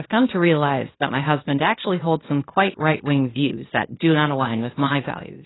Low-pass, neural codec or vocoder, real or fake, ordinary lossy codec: 7.2 kHz; codec, 16 kHz, 0.8 kbps, ZipCodec; fake; AAC, 16 kbps